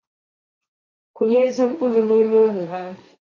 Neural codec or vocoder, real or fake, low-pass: codec, 16 kHz, 1.1 kbps, Voila-Tokenizer; fake; 7.2 kHz